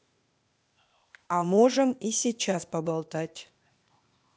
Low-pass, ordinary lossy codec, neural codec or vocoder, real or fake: none; none; codec, 16 kHz, 0.8 kbps, ZipCodec; fake